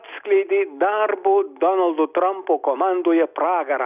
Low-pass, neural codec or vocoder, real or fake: 3.6 kHz; none; real